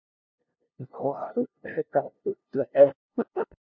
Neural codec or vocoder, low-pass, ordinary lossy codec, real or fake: codec, 16 kHz, 0.5 kbps, FunCodec, trained on LibriTTS, 25 frames a second; 7.2 kHz; MP3, 64 kbps; fake